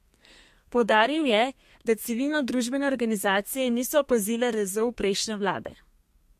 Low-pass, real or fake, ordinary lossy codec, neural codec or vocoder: 14.4 kHz; fake; MP3, 64 kbps; codec, 32 kHz, 1.9 kbps, SNAC